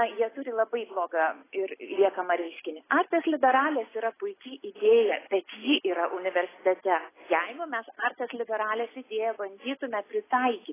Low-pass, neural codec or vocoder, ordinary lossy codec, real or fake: 3.6 kHz; none; AAC, 16 kbps; real